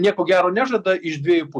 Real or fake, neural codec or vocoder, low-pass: real; none; 10.8 kHz